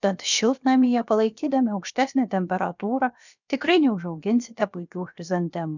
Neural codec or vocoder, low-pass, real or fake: codec, 16 kHz, about 1 kbps, DyCAST, with the encoder's durations; 7.2 kHz; fake